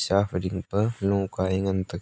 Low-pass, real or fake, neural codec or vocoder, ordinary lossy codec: none; real; none; none